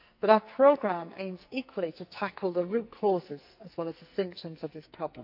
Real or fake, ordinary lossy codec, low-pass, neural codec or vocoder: fake; AAC, 48 kbps; 5.4 kHz; codec, 44.1 kHz, 2.6 kbps, SNAC